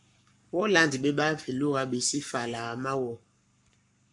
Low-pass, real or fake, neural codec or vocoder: 10.8 kHz; fake; codec, 44.1 kHz, 7.8 kbps, Pupu-Codec